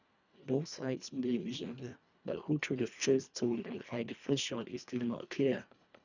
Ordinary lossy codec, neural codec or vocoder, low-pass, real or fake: none; codec, 24 kHz, 1.5 kbps, HILCodec; 7.2 kHz; fake